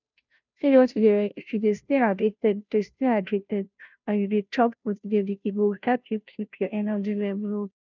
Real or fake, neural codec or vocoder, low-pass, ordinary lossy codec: fake; codec, 16 kHz, 0.5 kbps, FunCodec, trained on Chinese and English, 25 frames a second; 7.2 kHz; none